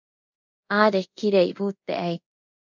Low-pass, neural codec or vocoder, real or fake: 7.2 kHz; codec, 24 kHz, 0.5 kbps, DualCodec; fake